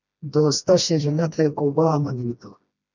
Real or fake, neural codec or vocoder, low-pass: fake; codec, 16 kHz, 1 kbps, FreqCodec, smaller model; 7.2 kHz